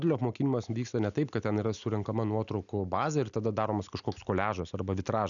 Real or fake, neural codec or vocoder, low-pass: real; none; 7.2 kHz